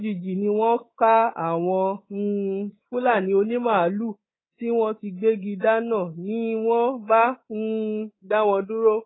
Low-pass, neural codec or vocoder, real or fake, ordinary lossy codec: 7.2 kHz; codec, 24 kHz, 3.1 kbps, DualCodec; fake; AAC, 16 kbps